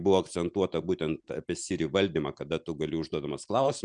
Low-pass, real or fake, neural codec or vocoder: 9.9 kHz; real; none